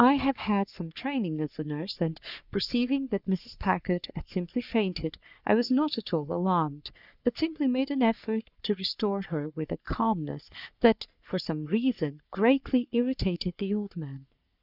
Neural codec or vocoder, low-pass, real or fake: codec, 44.1 kHz, 3.4 kbps, Pupu-Codec; 5.4 kHz; fake